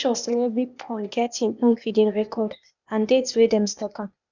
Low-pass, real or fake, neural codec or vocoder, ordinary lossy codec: 7.2 kHz; fake; codec, 16 kHz, 0.8 kbps, ZipCodec; none